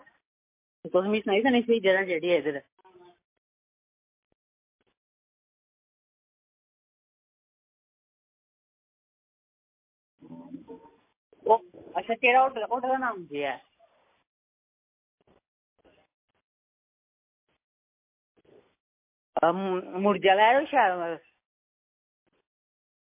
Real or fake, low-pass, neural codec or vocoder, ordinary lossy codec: real; 3.6 kHz; none; MP3, 24 kbps